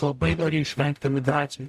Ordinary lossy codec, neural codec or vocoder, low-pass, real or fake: MP3, 96 kbps; codec, 44.1 kHz, 0.9 kbps, DAC; 14.4 kHz; fake